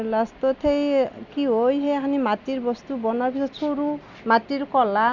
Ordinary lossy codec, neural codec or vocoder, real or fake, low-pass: none; none; real; 7.2 kHz